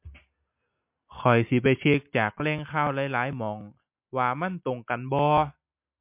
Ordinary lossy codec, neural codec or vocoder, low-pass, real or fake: MP3, 32 kbps; none; 3.6 kHz; real